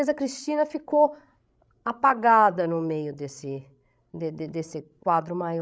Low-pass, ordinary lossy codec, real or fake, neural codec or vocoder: none; none; fake; codec, 16 kHz, 16 kbps, FreqCodec, larger model